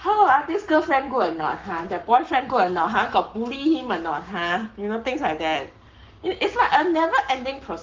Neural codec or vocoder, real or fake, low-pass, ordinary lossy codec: codec, 44.1 kHz, 7.8 kbps, Pupu-Codec; fake; 7.2 kHz; Opus, 32 kbps